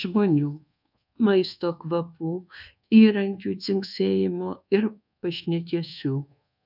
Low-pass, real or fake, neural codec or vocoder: 5.4 kHz; fake; codec, 24 kHz, 1.2 kbps, DualCodec